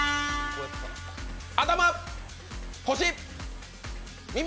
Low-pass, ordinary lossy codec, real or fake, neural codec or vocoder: none; none; real; none